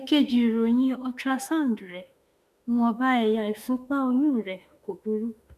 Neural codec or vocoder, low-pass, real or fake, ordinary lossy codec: autoencoder, 48 kHz, 32 numbers a frame, DAC-VAE, trained on Japanese speech; 14.4 kHz; fake; none